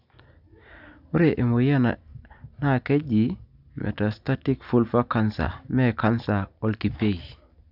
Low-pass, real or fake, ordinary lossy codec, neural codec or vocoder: 5.4 kHz; real; none; none